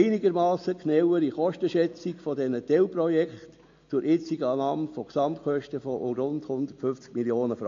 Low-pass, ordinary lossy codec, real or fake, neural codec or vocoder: 7.2 kHz; AAC, 48 kbps; real; none